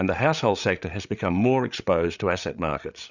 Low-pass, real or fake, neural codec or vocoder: 7.2 kHz; fake; codec, 16 kHz, 8 kbps, FreqCodec, larger model